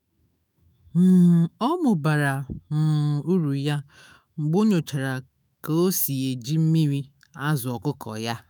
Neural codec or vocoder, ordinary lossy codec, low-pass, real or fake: autoencoder, 48 kHz, 128 numbers a frame, DAC-VAE, trained on Japanese speech; none; none; fake